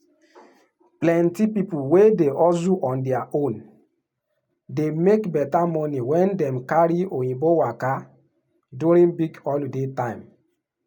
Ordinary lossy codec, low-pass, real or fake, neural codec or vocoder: none; 19.8 kHz; real; none